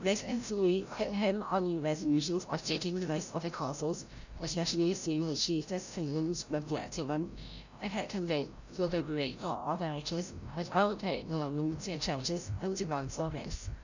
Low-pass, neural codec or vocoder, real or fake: 7.2 kHz; codec, 16 kHz, 0.5 kbps, FreqCodec, larger model; fake